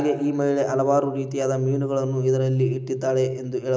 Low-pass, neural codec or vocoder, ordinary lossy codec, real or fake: none; none; none; real